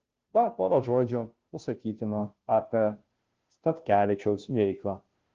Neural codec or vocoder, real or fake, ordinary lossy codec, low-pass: codec, 16 kHz, 0.5 kbps, FunCodec, trained on Chinese and English, 25 frames a second; fake; Opus, 16 kbps; 7.2 kHz